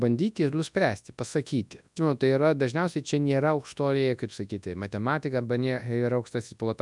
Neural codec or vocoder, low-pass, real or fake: codec, 24 kHz, 0.9 kbps, WavTokenizer, large speech release; 10.8 kHz; fake